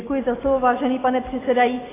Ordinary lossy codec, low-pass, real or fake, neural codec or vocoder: AAC, 16 kbps; 3.6 kHz; real; none